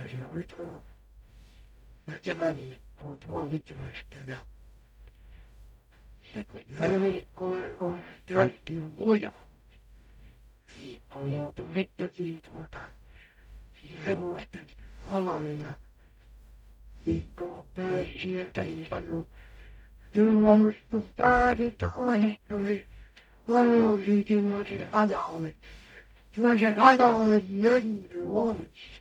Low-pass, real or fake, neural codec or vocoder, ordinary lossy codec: 19.8 kHz; fake; codec, 44.1 kHz, 0.9 kbps, DAC; none